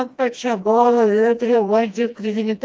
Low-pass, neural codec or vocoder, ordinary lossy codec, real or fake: none; codec, 16 kHz, 1 kbps, FreqCodec, smaller model; none; fake